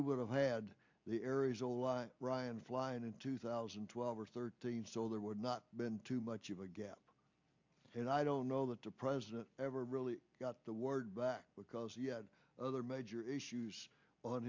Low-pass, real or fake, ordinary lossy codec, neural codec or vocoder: 7.2 kHz; real; MP3, 48 kbps; none